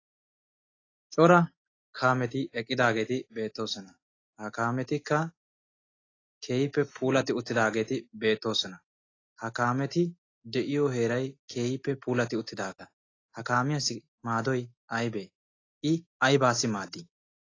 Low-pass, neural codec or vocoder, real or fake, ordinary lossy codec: 7.2 kHz; none; real; AAC, 32 kbps